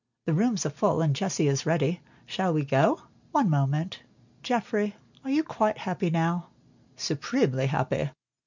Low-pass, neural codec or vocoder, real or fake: 7.2 kHz; none; real